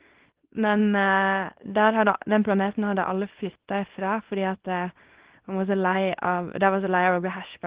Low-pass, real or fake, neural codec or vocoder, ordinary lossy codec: 3.6 kHz; fake; codec, 24 kHz, 0.9 kbps, WavTokenizer, small release; Opus, 16 kbps